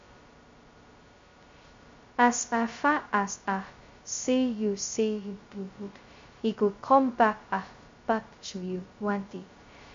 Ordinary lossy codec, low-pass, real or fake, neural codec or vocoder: MP3, 48 kbps; 7.2 kHz; fake; codec, 16 kHz, 0.2 kbps, FocalCodec